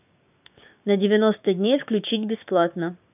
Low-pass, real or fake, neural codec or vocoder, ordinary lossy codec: 3.6 kHz; fake; codec, 16 kHz, 6 kbps, DAC; none